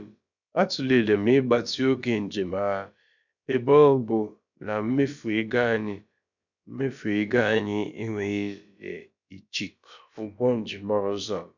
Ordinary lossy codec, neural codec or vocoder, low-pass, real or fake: none; codec, 16 kHz, about 1 kbps, DyCAST, with the encoder's durations; 7.2 kHz; fake